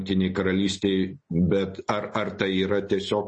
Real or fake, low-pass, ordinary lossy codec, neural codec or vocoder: real; 10.8 kHz; MP3, 32 kbps; none